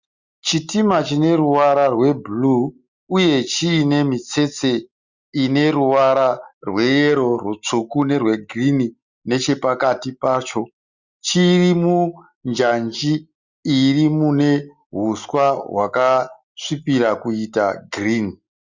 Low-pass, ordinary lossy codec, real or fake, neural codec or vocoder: 7.2 kHz; Opus, 64 kbps; real; none